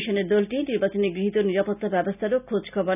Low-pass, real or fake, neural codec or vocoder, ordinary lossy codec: 3.6 kHz; real; none; none